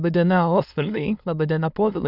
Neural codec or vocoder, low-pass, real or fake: autoencoder, 22.05 kHz, a latent of 192 numbers a frame, VITS, trained on many speakers; 5.4 kHz; fake